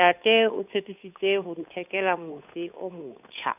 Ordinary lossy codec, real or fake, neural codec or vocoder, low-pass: none; fake; codec, 16 kHz, 8 kbps, FunCodec, trained on Chinese and English, 25 frames a second; 3.6 kHz